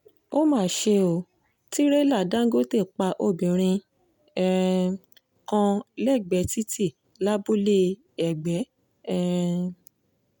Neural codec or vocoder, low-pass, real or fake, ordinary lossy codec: none; 19.8 kHz; real; none